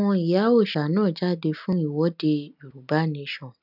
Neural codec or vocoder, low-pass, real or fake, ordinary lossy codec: none; 5.4 kHz; real; none